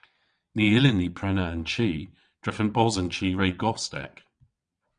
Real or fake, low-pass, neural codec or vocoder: fake; 9.9 kHz; vocoder, 22.05 kHz, 80 mel bands, WaveNeXt